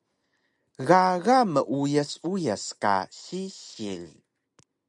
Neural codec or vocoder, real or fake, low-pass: none; real; 10.8 kHz